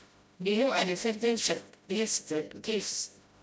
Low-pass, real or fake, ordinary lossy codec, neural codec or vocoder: none; fake; none; codec, 16 kHz, 0.5 kbps, FreqCodec, smaller model